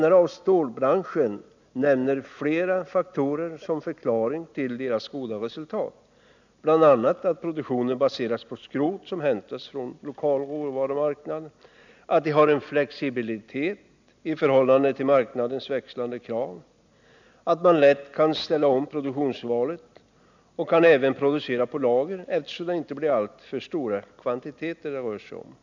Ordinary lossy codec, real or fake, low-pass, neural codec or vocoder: none; real; 7.2 kHz; none